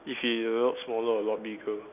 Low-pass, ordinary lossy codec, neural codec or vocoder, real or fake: 3.6 kHz; none; none; real